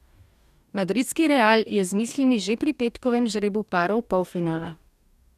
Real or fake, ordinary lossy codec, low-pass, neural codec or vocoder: fake; none; 14.4 kHz; codec, 44.1 kHz, 2.6 kbps, DAC